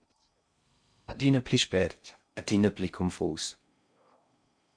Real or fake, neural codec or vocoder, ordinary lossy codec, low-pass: fake; codec, 16 kHz in and 24 kHz out, 0.6 kbps, FocalCodec, streaming, 2048 codes; MP3, 64 kbps; 9.9 kHz